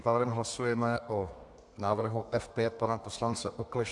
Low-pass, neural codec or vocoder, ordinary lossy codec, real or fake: 10.8 kHz; codec, 32 kHz, 1.9 kbps, SNAC; AAC, 64 kbps; fake